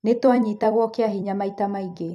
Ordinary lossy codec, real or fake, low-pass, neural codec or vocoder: none; fake; 14.4 kHz; vocoder, 44.1 kHz, 128 mel bands every 256 samples, BigVGAN v2